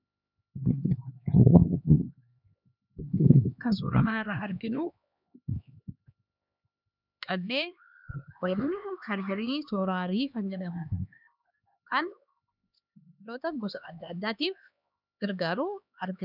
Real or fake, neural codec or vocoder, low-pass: fake; codec, 16 kHz, 2 kbps, X-Codec, HuBERT features, trained on LibriSpeech; 5.4 kHz